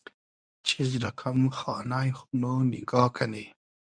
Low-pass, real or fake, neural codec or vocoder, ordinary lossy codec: 9.9 kHz; fake; codec, 24 kHz, 0.9 kbps, WavTokenizer, medium speech release version 1; MP3, 96 kbps